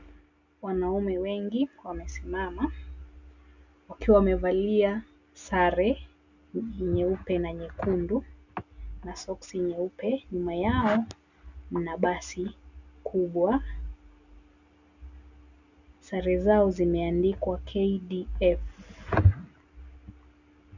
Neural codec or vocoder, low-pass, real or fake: none; 7.2 kHz; real